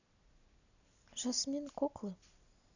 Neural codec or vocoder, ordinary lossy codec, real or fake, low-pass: none; none; real; 7.2 kHz